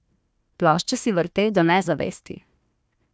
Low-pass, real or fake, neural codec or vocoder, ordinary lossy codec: none; fake; codec, 16 kHz, 1 kbps, FunCodec, trained on Chinese and English, 50 frames a second; none